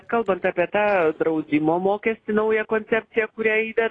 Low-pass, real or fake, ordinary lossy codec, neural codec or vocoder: 9.9 kHz; real; AAC, 32 kbps; none